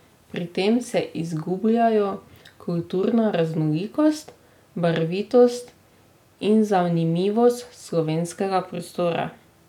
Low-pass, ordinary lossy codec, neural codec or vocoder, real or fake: 19.8 kHz; none; none; real